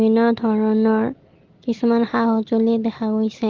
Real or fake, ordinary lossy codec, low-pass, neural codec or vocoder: real; Opus, 16 kbps; 7.2 kHz; none